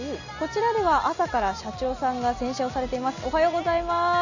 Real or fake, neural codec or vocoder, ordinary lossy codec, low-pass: real; none; none; 7.2 kHz